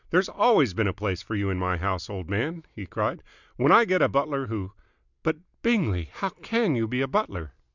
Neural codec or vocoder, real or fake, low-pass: none; real; 7.2 kHz